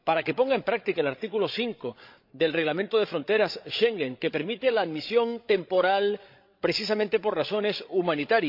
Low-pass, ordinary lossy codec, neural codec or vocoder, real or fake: 5.4 kHz; MP3, 48 kbps; codec, 16 kHz, 16 kbps, FreqCodec, larger model; fake